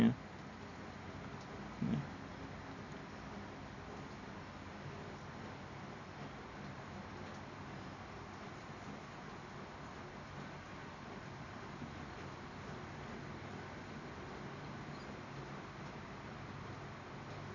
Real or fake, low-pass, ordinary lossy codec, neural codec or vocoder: real; 7.2 kHz; none; none